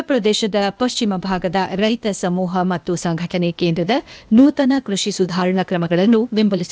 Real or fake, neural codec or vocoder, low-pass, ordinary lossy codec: fake; codec, 16 kHz, 0.8 kbps, ZipCodec; none; none